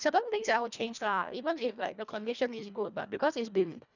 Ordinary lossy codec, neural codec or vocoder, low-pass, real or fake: none; codec, 24 kHz, 1.5 kbps, HILCodec; 7.2 kHz; fake